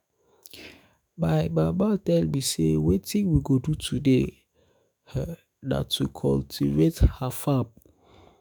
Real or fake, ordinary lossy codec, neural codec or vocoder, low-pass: fake; none; autoencoder, 48 kHz, 128 numbers a frame, DAC-VAE, trained on Japanese speech; none